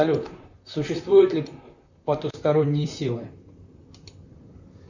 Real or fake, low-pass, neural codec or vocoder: fake; 7.2 kHz; vocoder, 44.1 kHz, 128 mel bands, Pupu-Vocoder